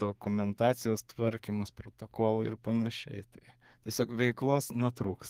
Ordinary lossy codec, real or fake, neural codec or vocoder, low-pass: Opus, 24 kbps; fake; codec, 32 kHz, 1.9 kbps, SNAC; 14.4 kHz